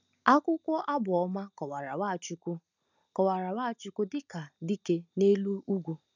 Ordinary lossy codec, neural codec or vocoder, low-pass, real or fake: none; none; 7.2 kHz; real